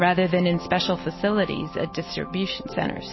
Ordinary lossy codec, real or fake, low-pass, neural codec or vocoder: MP3, 24 kbps; real; 7.2 kHz; none